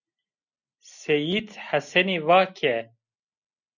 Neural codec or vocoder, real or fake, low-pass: none; real; 7.2 kHz